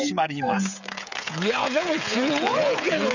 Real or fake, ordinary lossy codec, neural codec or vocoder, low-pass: fake; none; codec, 16 kHz, 8 kbps, FreqCodec, smaller model; 7.2 kHz